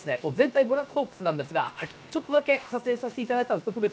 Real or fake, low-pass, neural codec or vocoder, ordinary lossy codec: fake; none; codec, 16 kHz, 0.7 kbps, FocalCodec; none